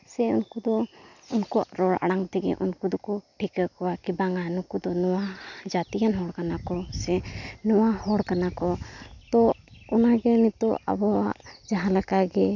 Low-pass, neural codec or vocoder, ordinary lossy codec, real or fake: 7.2 kHz; none; none; real